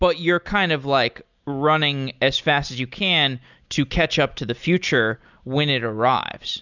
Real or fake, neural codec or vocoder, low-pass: real; none; 7.2 kHz